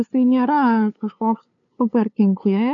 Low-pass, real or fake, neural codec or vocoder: 7.2 kHz; fake; codec, 16 kHz, 2 kbps, FunCodec, trained on LibriTTS, 25 frames a second